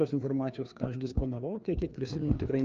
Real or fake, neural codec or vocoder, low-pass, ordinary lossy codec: fake; codec, 16 kHz, 2 kbps, FreqCodec, larger model; 7.2 kHz; Opus, 24 kbps